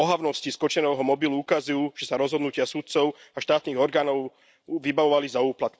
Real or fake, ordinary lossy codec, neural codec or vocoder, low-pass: real; none; none; none